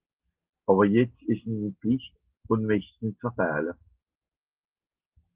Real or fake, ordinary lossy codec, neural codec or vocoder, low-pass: real; Opus, 16 kbps; none; 3.6 kHz